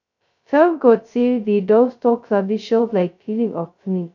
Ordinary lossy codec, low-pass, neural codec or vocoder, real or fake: none; 7.2 kHz; codec, 16 kHz, 0.2 kbps, FocalCodec; fake